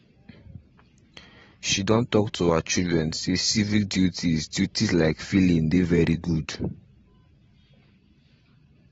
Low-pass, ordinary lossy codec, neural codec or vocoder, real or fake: 19.8 kHz; AAC, 24 kbps; vocoder, 44.1 kHz, 128 mel bands every 512 samples, BigVGAN v2; fake